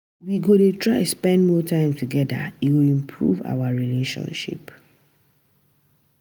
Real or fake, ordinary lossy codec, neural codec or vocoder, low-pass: real; none; none; none